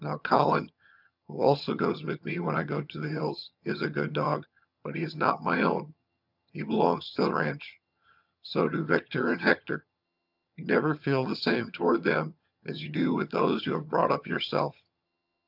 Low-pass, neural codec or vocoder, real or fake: 5.4 kHz; vocoder, 22.05 kHz, 80 mel bands, HiFi-GAN; fake